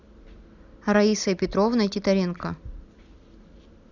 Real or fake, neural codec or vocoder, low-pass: real; none; 7.2 kHz